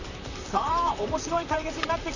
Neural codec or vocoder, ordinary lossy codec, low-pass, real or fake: vocoder, 44.1 kHz, 128 mel bands, Pupu-Vocoder; none; 7.2 kHz; fake